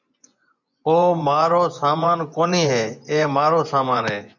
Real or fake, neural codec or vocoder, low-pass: fake; vocoder, 24 kHz, 100 mel bands, Vocos; 7.2 kHz